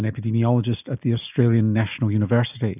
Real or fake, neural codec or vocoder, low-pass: real; none; 3.6 kHz